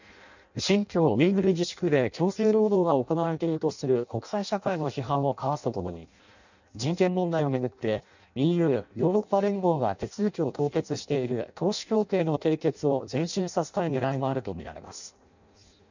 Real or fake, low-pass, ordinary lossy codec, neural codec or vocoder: fake; 7.2 kHz; none; codec, 16 kHz in and 24 kHz out, 0.6 kbps, FireRedTTS-2 codec